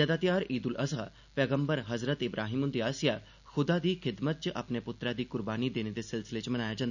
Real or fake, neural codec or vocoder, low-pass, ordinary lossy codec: real; none; 7.2 kHz; none